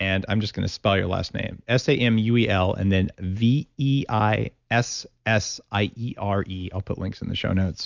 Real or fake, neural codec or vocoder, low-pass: real; none; 7.2 kHz